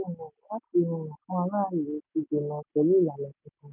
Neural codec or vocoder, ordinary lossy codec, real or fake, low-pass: none; none; real; 3.6 kHz